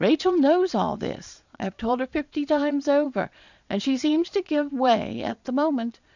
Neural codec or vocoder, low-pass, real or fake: vocoder, 22.05 kHz, 80 mel bands, Vocos; 7.2 kHz; fake